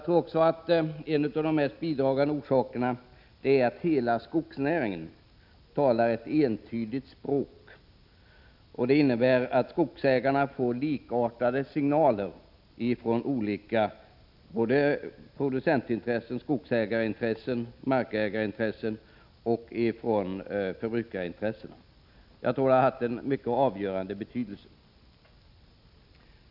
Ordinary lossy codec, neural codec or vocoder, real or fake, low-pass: none; none; real; 5.4 kHz